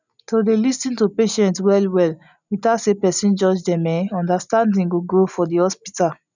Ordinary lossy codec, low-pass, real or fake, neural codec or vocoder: none; 7.2 kHz; real; none